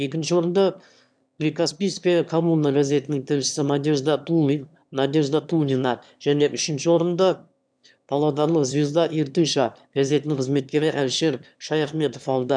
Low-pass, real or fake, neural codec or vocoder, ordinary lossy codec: 9.9 kHz; fake; autoencoder, 22.05 kHz, a latent of 192 numbers a frame, VITS, trained on one speaker; none